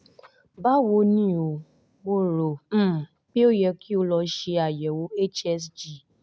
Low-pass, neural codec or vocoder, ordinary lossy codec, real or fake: none; none; none; real